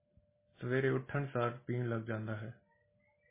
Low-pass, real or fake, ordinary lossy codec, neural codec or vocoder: 3.6 kHz; real; MP3, 16 kbps; none